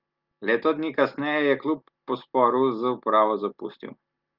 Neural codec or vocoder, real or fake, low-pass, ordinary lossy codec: none; real; 5.4 kHz; Opus, 32 kbps